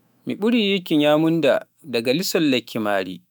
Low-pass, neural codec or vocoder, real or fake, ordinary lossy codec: none; autoencoder, 48 kHz, 128 numbers a frame, DAC-VAE, trained on Japanese speech; fake; none